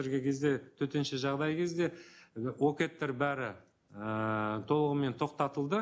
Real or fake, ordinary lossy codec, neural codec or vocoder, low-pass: real; none; none; none